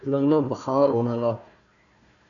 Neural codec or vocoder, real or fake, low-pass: codec, 16 kHz, 1 kbps, FunCodec, trained on Chinese and English, 50 frames a second; fake; 7.2 kHz